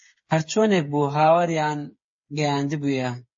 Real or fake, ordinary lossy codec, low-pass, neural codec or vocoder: fake; MP3, 32 kbps; 7.2 kHz; codec, 16 kHz, 8 kbps, FreqCodec, smaller model